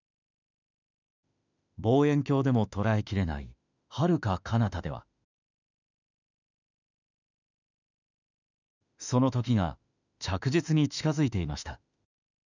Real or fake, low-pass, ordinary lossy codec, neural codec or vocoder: fake; 7.2 kHz; none; autoencoder, 48 kHz, 32 numbers a frame, DAC-VAE, trained on Japanese speech